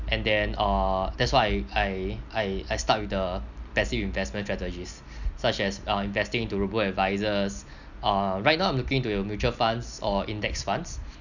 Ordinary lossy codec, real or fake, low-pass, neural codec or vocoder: none; real; 7.2 kHz; none